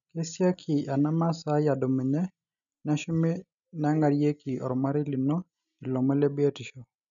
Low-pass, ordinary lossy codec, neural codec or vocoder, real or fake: 7.2 kHz; none; none; real